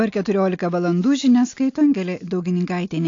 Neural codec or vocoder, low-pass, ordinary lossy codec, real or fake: none; 7.2 kHz; AAC, 48 kbps; real